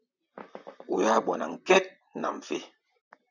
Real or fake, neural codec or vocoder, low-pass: fake; vocoder, 44.1 kHz, 128 mel bands, Pupu-Vocoder; 7.2 kHz